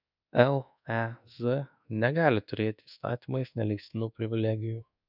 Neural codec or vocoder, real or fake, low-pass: codec, 24 kHz, 1.2 kbps, DualCodec; fake; 5.4 kHz